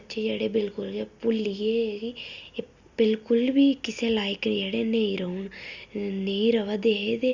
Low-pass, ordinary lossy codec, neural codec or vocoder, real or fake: 7.2 kHz; Opus, 64 kbps; none; real